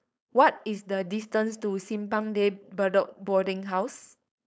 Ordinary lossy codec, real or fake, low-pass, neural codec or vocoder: none; fake; none; codec, 16 kHz, 4.8 kbps, FACodec